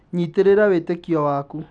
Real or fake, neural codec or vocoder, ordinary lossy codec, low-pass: real; none; none; 9.9 kHz